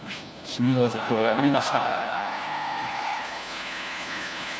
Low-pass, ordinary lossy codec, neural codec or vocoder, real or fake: none; none; codec, 16 kHz, 1 kbps, FunCodec, trained on LibriTTS, 50 frames a second; fake